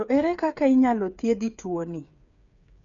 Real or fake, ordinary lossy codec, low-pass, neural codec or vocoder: fake; none; 7.2 kHz; codec, 16 kHz, 8 kbps, FreqCodec, smaller model